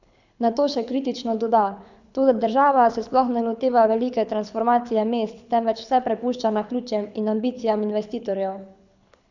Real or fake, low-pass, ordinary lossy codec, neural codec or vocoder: fake; 7.2 kHz; none; codec, 24 kHz, 6 kbps, HILCodec